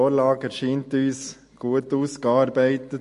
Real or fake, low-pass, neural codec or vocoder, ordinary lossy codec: real; 10.8 kHz; none; MP3, 48 kbps